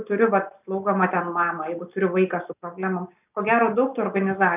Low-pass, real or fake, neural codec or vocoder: 3.6 kHz; real; none